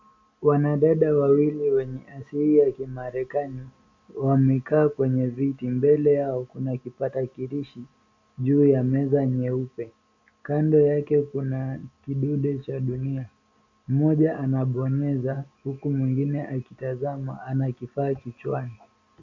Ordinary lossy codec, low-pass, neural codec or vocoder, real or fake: MP3, 64 kbps; 7.2 kHz; none; real